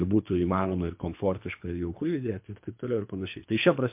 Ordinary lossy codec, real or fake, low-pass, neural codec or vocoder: MP3, 32 kbps; fake; 3.6 kHz; codec, 24 kHz, 3 kbps, HILCodec